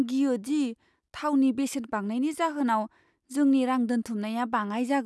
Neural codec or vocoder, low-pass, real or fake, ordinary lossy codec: none; none; real; none